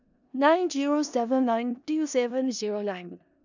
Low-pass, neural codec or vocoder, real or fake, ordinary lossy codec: 7.2 kHz; codec, 16 kHz in and 24 kHz out, 0.4 kbps, LongCat-Audio-Codec, four codebook decoder; fake; none